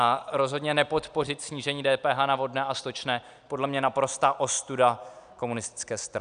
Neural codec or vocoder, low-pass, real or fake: none; 9.9 kHz; real